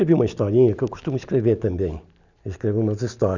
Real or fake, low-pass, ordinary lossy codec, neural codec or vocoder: real; 7.2 kHz; none; none